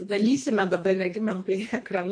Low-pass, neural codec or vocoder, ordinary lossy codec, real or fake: 9.9 kHz; codec, 24 kHz, 1.5 kbps, HILCodec; MP3, 48 kbps; fake